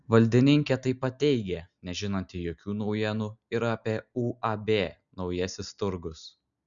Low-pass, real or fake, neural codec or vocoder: 7.2 kHz; real; none